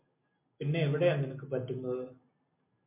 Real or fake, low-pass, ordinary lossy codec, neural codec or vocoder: real; 3.6 kHz; MP3, 32 kbps; none